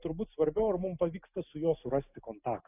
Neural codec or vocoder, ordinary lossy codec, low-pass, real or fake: none; AAC, 32 kbps; 3.6 kHz; real